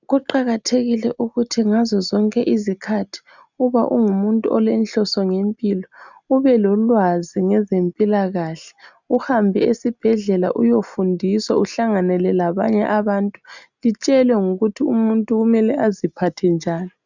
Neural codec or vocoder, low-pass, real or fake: none; 7.2 kHz; real